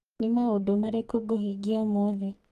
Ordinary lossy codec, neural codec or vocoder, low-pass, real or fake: Opus, 24 kbps; codec, 44.1 kHz, 2.6 kbps, SNAC; 14.4 kHz; fake